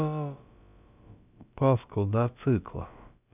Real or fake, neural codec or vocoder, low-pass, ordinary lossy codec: fake; codec, 16 kHz, about 1 kbps, DyCAST, with the encoder's durations; 3.6 kHz; none